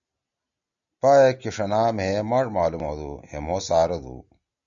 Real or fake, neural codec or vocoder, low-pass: real; none; 7.2 kHz